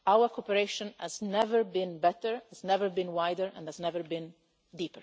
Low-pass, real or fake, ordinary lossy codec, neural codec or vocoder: none; real; none; none